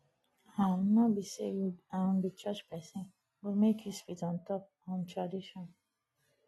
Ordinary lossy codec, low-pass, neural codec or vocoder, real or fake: AAC, 32 kbps; 19.8 kHz; none; real